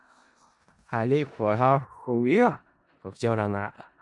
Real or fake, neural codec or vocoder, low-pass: fake; codec, 16 kHz in and 24 kHz out, 0.4 kbps, LongCat-Audio-Codec, four codebook decoder; 10.8 kHz